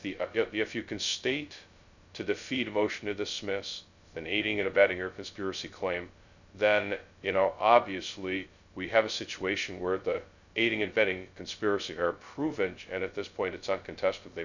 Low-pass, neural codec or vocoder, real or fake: 7.2 kHz; codec, 16 kHz, 0.2 kbps, FocalCodec; fake